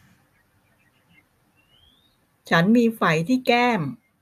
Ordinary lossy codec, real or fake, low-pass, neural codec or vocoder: none; real; 14.4 kHz; none